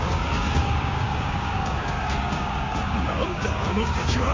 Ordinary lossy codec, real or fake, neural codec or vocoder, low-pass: MP3, 32 kbps; fake; codec, 16 kHz, 6 kbps, DAC; 7.2 kHz